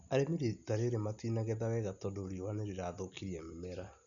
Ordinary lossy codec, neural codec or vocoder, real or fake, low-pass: none; none; real; 7.2 kHz